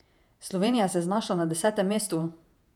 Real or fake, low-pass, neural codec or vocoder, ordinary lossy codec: fake; 19.8 kHz; vocoder, 48 kHz, 128 mel bands, Vocos; none